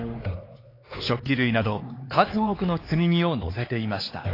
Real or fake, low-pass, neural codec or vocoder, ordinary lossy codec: fake; 5.4 kHz; codec, 16 kHz, 4 kbps, X-Codec, HuBERT features, trained on LibriSpeech; AAC, 24 kbps